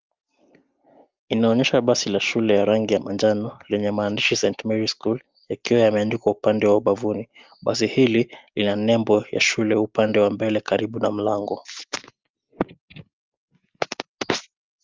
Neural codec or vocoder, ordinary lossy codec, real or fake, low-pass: none; Opus, 24 kbps; real; 7.2 kHz